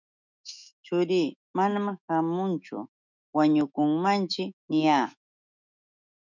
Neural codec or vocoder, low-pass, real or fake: autoencoder, 48 kHz, 128 numbers a frame, DAC-VAE, trained on Japanese speech; 7.2 kHz; fake